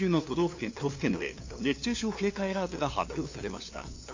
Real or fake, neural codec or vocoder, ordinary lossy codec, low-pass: fake; codec, 16 kHz, 2 kbps, FunCodec, trained on LibriTTS, 25 frames a second; AAC, 48 kbps; 7.2 kHz